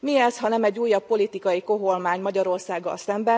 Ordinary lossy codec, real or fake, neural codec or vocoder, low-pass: none; real; none; none